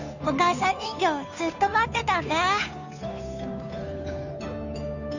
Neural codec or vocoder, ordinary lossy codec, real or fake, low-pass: codec, 16 kHz, 2 kbps, FunCodec, trained on Chinese and English, 25 frames a second; none; fake; 7.2 kHz